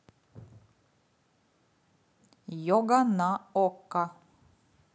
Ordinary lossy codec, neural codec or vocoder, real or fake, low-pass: none; none; real; none